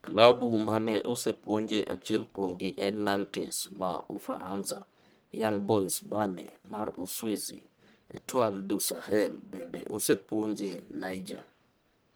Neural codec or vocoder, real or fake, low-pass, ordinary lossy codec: codec, 44.1 kHz, 1.7 kbps, Pupu-Codec; fake; none; none